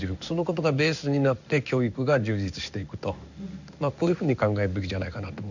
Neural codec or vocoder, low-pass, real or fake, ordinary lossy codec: codec, 16 kHz in and 24 kHz out, 1 kbps, XY-Tokenizer; 7.2 kHz; fake; none